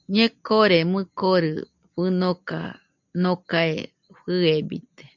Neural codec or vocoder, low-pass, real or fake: none; 7.2 kHz; real